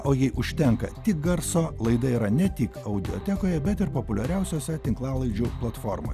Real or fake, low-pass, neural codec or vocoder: real; 14.4 kHz; none